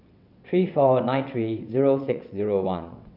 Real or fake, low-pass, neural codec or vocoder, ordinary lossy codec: fake; 5.4 kHz; vocoder, 22.05 kHz, 80 mel bands, WaveNeXt; none